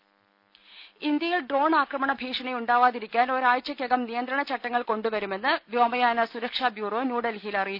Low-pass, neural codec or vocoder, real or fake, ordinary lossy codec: 5.4 kHz; none; real; none